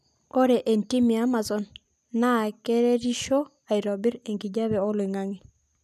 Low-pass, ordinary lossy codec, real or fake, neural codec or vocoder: 14.4 kHz; none; real; none